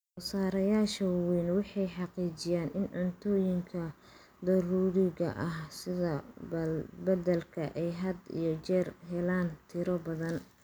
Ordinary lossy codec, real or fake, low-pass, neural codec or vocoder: none; real; none; none